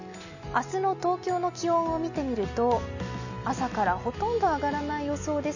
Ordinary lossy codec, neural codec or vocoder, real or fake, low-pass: none; none; real; 7.2 kHz